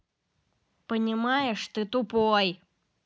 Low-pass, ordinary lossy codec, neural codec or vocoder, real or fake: none; none; none; real